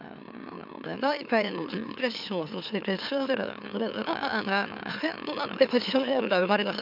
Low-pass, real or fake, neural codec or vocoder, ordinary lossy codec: 5.4 kHz; fake; autoencoder, 44.1 kHz, a latent of 192 numbers a frame, MeloTTS; none